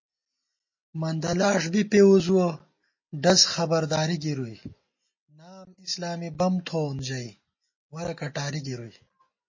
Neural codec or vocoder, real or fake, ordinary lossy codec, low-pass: none; real; MP3, 32 kbps; 7.2 kHz